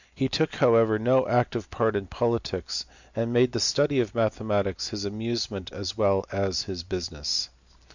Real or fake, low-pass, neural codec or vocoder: real; 7.2 kHz; none